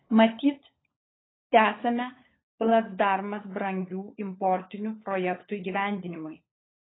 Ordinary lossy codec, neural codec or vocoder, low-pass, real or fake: AAC, 16 kbps; codec, 16 kHz, 16 kbps, FunCodec, trained on LibriTTS, 50 frames a second; 7.2 kHz; fake